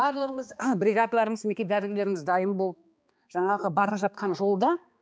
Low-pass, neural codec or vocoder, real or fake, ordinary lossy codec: none; codec, 16 kHz, 2 kbps, X-Codec, HuBERT features, trained on balanced general audio; fake; none